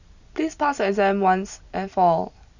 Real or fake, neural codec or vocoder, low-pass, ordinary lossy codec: real; none; 7.2 kHz; none